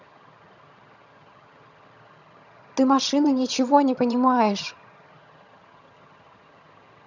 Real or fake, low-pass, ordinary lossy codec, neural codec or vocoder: fake; 7.2 kHz; none; vocoder, 22.05 kHz, 80 mel bands, HiFi-GAN